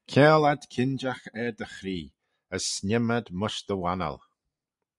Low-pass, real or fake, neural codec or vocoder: 10.8 kHz; real; none